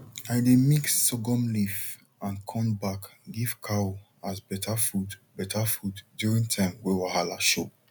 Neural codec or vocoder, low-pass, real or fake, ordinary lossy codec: none; none; real; none